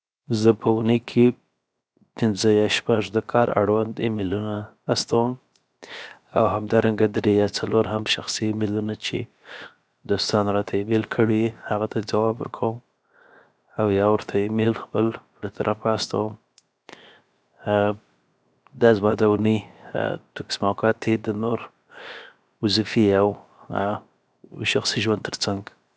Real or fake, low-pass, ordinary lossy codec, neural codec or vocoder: fake; none; none; codec, 16 kHz, 0.7 kbps, FocalCodec